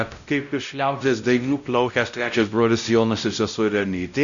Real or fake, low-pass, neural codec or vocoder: fake; 7.2 kHz; codec, 16 kHz, 0.5 kbps, X-Codec, WavLM features, trained on Multilingual LibriSpeech